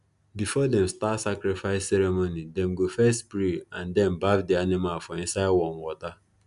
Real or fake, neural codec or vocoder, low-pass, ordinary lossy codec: real; none; 10.8 kHz; none